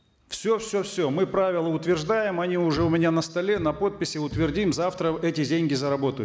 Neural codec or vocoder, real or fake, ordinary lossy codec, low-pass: none; real; none; none